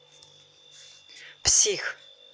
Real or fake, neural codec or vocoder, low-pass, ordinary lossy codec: real; none; none; none